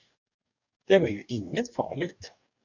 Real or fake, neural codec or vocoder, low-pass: fake; codec, 44.1 kHz, 2.6 kbps, DAC; 7.2 kHz